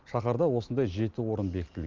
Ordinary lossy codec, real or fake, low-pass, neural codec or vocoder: Opus, 32 kbps; real; 7.2 kHz; none